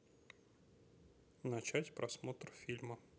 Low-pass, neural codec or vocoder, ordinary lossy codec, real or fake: none; none; none; real